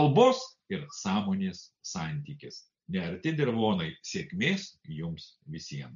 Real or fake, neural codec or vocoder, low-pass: real; none; 7.2 kHz